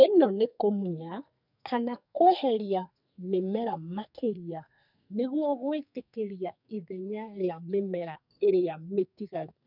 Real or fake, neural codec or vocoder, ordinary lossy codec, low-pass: fake; codec, 44.1 kHz, 2.6 kbps, SNAC; none; 5.4 kHz